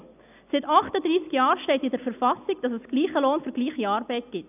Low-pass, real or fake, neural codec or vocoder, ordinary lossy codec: 3.6 kHz; real; none; none